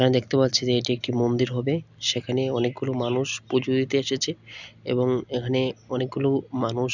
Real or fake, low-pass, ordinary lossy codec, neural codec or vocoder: real; 7.2 kHz; none; none